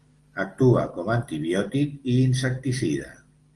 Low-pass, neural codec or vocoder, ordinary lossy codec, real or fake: 10.8 kHz; none; Opus, 24 kbps; real